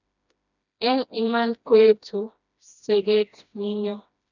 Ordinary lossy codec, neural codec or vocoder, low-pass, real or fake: none; codec, 16 kHz, 1 kbps, FreqCodec, smaller model; 7.2 kHz; fake